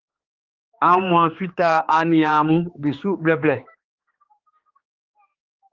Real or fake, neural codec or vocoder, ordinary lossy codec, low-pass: fake; codec, 16 kHz, 2 kbps, X-Codec, HuBERT features, trained on general audio; Opus, 32 kbps; 7.2 kHz